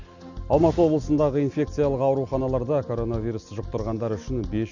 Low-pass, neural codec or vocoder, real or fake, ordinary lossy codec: 7.2 kHz; none; real; none